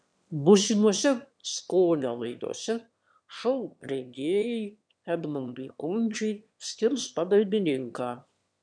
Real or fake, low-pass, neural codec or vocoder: fake; 9.9 kHz; autoencoder, 22.05 kHz, a latent of 192 numbers a frame, VITS, trained on one speaker